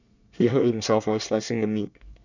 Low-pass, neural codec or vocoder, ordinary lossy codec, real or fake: 7.2 kHz; codec, 24 kHz, 1 kbps, SNAC; none; fake